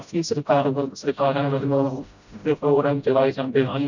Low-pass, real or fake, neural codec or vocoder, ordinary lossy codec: 7.2 kHz; fake; codec, 16 kHz, 0.5 kbps, FreqCodec, smaller model; none